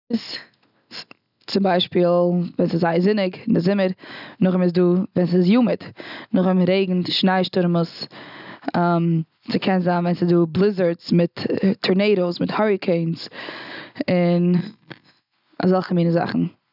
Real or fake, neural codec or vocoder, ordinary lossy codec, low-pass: real; none; none; 5.4 kHz